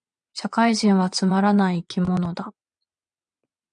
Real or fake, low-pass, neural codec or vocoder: fake; 9.9 kHz; vocoder, 22.05 kHz, 80 mel bands, WaveNeXt